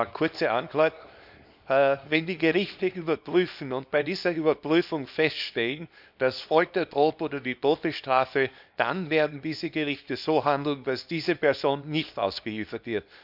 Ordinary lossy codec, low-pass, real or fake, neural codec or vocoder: none; 5.4 kHz; fake; codec, 24 kHz, 0.9 kbps, WavTokenizer, small release